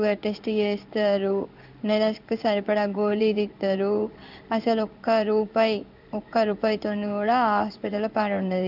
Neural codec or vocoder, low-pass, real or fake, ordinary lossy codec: codec, 16 kHz in and 24 kHz out, 1 kbps, XY-Tokenizer; 5.4 kHz; fake; none